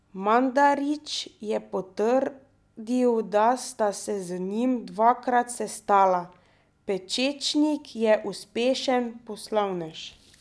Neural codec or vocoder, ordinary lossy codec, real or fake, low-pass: none; none; real; none